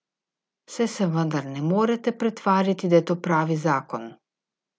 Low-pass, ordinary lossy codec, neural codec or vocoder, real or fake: none; none; none; real